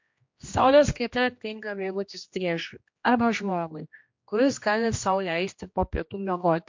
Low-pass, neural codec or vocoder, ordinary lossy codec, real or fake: 7.2 kHz; codec, 16 kHz, 1 kbps, X-Codec, HuBERT features, trained on general audio; MP3, 48 kbps; fake